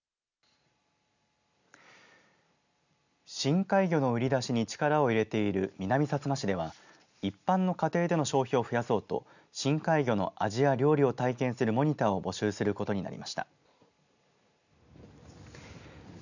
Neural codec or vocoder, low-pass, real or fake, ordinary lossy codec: none; 7.2 kHz; real; none